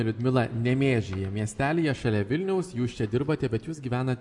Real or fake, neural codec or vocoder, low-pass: real; none; 10.8 kHz